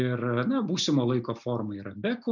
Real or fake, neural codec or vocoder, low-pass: real; none; 7.2 kHz